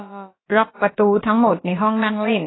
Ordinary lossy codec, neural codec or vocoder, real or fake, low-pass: AAC, 16 kbps; codec, 16 kHz, about 1 kbps, DyCAST, with the encoder's durations; fake; 7.2 kHz